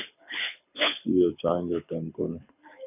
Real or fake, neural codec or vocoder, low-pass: fake; codec, 24 kHz, 6 kbps, HILCodec; 3.6 kHz